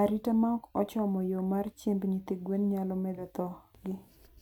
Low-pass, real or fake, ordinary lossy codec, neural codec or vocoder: 19.8 kHz; real; none; none